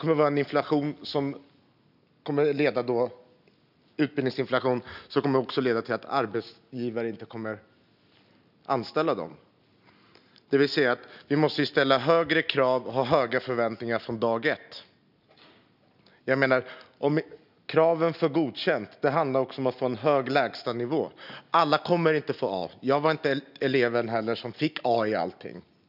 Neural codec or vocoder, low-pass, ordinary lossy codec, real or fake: none; 5.4 kHz; none; real